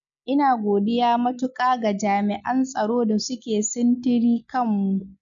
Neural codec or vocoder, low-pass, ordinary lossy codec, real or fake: none; 7.2 kHz; none; real